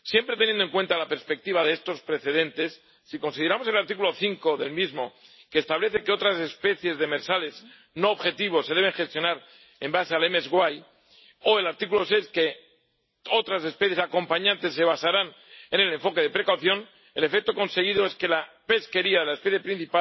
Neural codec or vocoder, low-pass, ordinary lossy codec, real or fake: none; 7.2 kHz; MP3, 24 kbps; real